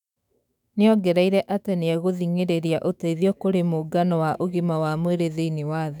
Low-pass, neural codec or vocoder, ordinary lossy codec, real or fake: 19.8 kHz; autoencoder, 48 kHz, 128 numbers a frame, DAC-VAE, trained on Japanese speech; none; fake